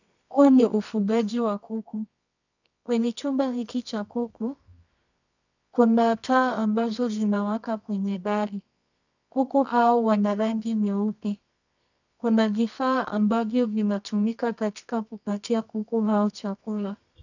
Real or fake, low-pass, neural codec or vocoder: fake; 7.2 kHz; codec, 24 kHz, 0.9 kbps, WavTokenizer, medium music audio release